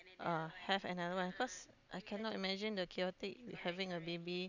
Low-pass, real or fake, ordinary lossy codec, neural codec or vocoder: 7.2 kHz; real; none; none